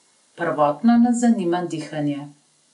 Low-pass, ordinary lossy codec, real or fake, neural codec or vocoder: 10.8 kHz; none; real; none